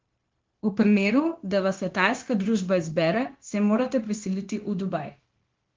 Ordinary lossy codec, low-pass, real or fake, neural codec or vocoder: Opus, 16 kbps; 7.2 kHz; fake; codec, 16 kHz, 0.9 kbps, LongCat-Audio-Codec